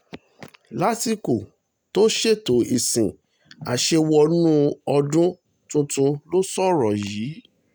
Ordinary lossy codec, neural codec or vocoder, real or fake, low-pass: none; none; real; none